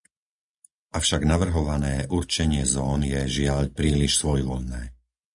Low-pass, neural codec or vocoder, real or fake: 10.8 kHz; none; real